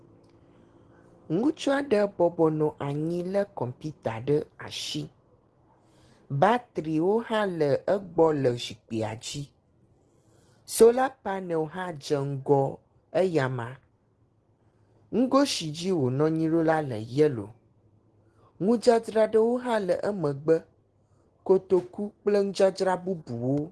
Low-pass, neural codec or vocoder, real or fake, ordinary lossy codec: 10.8 kHz; none; real; Opus, 16 kbps